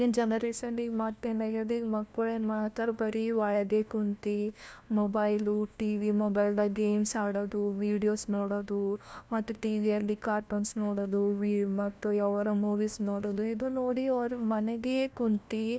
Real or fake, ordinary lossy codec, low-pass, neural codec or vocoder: fake; none; none; codec, 16 kHz, 1 kbps, FunCodec, trained on LibriTTS, 50 frames a second